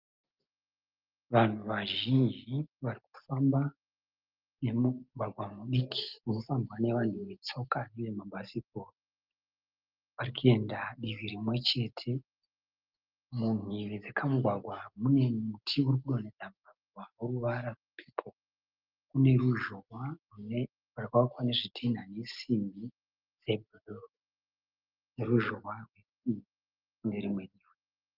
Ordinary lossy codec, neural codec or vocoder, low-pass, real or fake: Opus, 24 kbps; none; 5.4 kHz; real